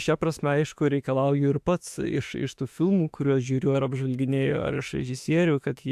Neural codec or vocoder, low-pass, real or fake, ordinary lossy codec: autoencoder, 48 kHz, 32 numbers a frame, DAC-VAE, trained on Japanese speech; 14.4 kHz; fake; AAC, 96 kbps